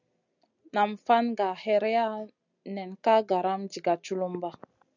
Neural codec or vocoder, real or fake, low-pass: none; real; 7.2 kHz